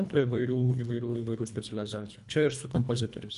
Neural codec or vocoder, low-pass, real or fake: codec, 24 kHz, 1.5 kbps, HILCodec; 10.8 kHz; fake